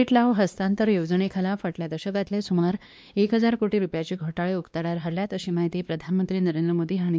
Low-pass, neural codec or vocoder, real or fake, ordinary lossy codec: none; codec, 16 kHz, 2 kbps, X-Codec, WavLM features, trained on Multilingual LibriSpeech; fake; none